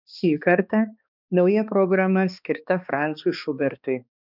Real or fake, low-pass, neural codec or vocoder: fake; 5.4 kHz; codec, 16 kHz, 2 kbps, X-Codec, HuBERT features, trained on balanced general audio